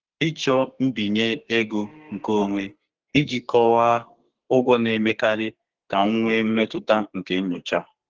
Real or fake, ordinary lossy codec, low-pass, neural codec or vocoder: fake; Opus, 16 kbps; 7.2 kHz; codec, 32 kHz, 1.9 kbps, SNAC